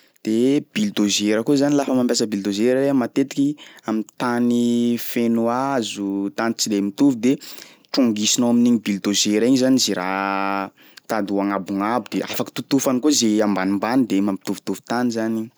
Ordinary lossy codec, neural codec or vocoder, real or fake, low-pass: none; none; real; none